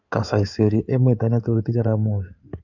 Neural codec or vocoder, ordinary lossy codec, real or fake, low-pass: codec, 16 kHz in and 24 kHz out, 2.2 kbps, FireRedTTS-2 codec; none; fake; 7.2 kHz